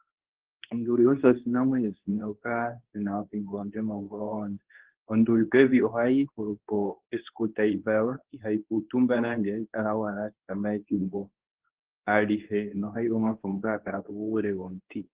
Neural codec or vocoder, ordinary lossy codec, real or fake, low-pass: codec, 24 kHz, 0.9 kbps, WavTokenizer, medium speech release version 2; Opus, 16 kbps; fake; 3.6 kHz